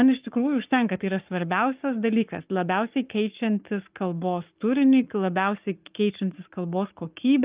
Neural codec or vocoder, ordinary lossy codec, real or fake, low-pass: codec, 44.1 kHz, 7.8 kbps, Pupu-Codec; Opus, 24 kbps; fake; 3.6 kHz